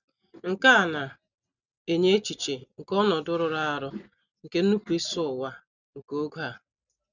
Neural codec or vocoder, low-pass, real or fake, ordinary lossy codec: none; 7.2 kHz; real; none